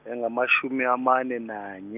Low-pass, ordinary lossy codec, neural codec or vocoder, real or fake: 3.6 kHz; none; none; real